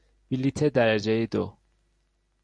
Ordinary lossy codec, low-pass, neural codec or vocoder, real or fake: MP3, 96 kbps; 9.9 kHz; none; real